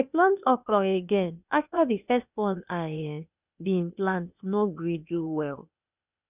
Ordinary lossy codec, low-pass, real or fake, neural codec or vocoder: none; 3.6 kHz; fake; codec, 16 kHz, 0.8 kbps, ZipCodec